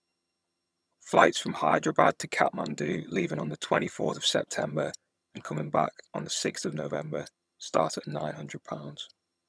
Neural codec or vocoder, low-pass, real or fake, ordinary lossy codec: vocoder, 22.05 kHz, 80 mel bands, HiFi-GAN; none; fake; none